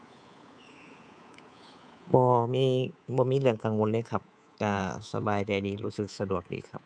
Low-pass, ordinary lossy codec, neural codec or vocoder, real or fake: 9.9 kHz; none; codec, 24 kHz, 3.1 kbps, DualCodec; fake